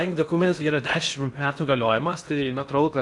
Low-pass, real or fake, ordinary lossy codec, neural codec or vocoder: 10.8 kHz; fake; MP3, 96 kbps; codec, 16 kHz in and 24 kHz out, 0.6 kbps, FocalCodec, streaming, 4096 codes